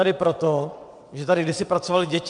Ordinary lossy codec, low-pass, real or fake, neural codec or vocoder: MP3, 64 kbps; 9.9 kHz; fake; vocoder, 22.05 kHz, 80 mel bands, WaveNeXt